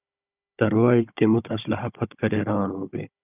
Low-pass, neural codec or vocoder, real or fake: 3.6 kHz; codec, 16 kHz, 16 kbps, FunCodec, trained on Chinese and English, 50 frames a second; fake